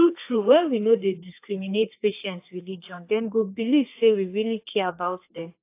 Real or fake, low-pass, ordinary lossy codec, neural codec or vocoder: fake; 3.6 kHz; AAC, 24 kbps; codec, 32 kHz, 1.9 kbps, SNAC